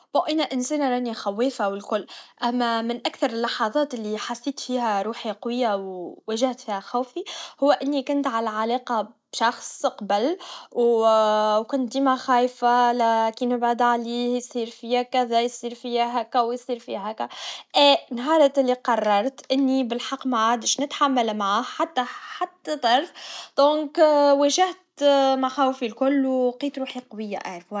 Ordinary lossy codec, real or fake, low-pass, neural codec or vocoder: none; real; none; none